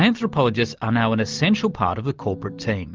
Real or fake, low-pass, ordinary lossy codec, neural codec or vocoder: real; 7.2 kHz; Opus, 32 kbps; none